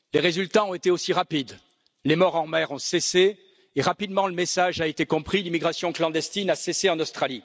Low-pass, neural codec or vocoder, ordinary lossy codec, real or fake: none; none; none; real